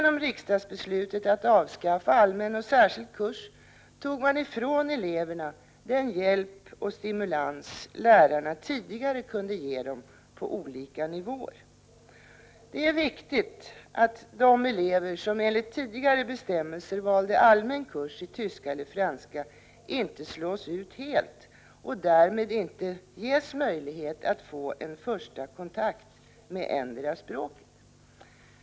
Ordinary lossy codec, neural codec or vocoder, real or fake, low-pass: none; none; real; none